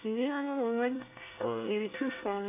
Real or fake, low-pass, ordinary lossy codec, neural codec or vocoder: fake; 3.6 kHz; AAC, 24 kbps; codec, 24 kHz, 1 kbps, SNAC